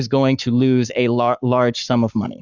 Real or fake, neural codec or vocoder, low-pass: fake; codec, 44.1 kHz, 7.8 kbps, Pupu-Codec; 7.2 kHz